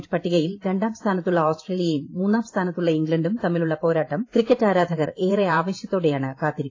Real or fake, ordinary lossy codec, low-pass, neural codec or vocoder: fake; AAC, 32 kbps; 7.2 kHz; vocoder, 44.1 kHz, 128 mel bands every 512 samples, BigVGAN v2